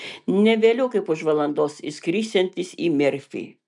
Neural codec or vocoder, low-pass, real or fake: none; 10.8 kHz; real